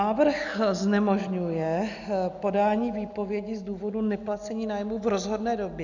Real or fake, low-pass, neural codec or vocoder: real; 7.2 kHz; none